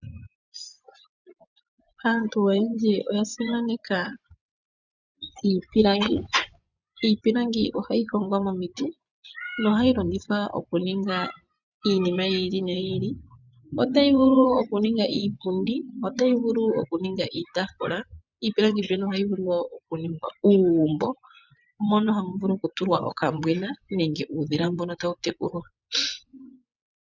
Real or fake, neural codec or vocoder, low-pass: fake; vocoder, 24 kHz, 100 mel bands, Vocos; 7.2 kHz